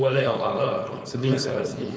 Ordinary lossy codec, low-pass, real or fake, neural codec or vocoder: none; none; fake; codec, 16 kHz, 4.8 kbps, FACodec